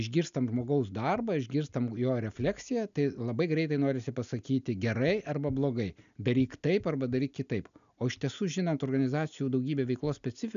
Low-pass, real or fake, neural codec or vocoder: 7.2 kHz; real; none